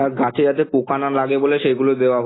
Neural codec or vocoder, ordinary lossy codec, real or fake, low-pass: none; AAC, 16 kbps; real; 7.2 kHz